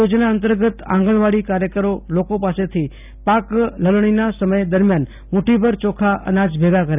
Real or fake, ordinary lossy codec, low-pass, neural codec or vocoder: real; none; 3.6 kHz; none